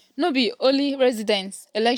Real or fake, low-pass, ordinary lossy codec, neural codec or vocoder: real; 19.8 kHz; none; none